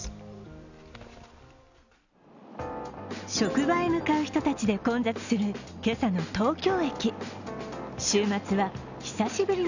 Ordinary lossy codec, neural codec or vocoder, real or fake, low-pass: none; none; real; 7.2 kHz